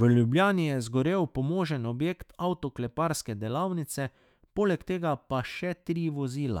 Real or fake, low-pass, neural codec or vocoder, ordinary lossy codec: fake; 19.8 kHz; autoencoder, 48 kHz, 128 numbers a frame, DAC-VAE, trained on Japanese speech; none